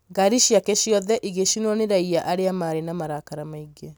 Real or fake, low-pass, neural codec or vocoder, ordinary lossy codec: real; none; none; none